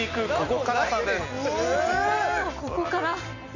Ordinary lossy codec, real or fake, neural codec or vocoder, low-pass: none; real; none; 7.2 kHz